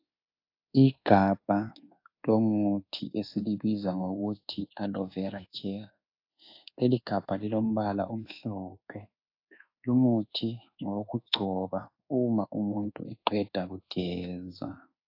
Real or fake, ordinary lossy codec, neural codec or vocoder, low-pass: fake; AAC, 32 kbps; codec, 24 kHz, 1.2 kbps, DualCodec; 5.4 kHz